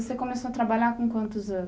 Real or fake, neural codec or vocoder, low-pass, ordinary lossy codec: real; none; none; none